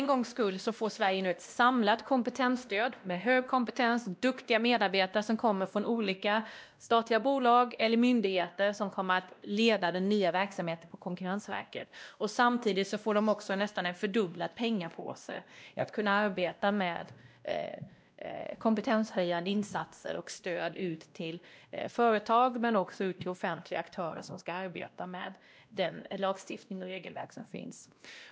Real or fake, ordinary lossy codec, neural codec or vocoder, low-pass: fake; none; codec, 16 kHz, 1 kbps, X-Codec, WavLM features, trained on Multilingual LibriSpeech; none